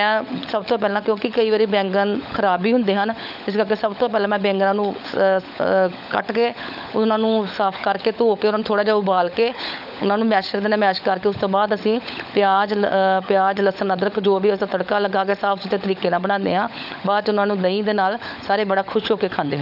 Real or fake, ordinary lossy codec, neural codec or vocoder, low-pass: fake; none; codec, 16 kHz, 16 kbps, FunCodec, trained on LibriTTS, 50 frames a second; 5.4 kHz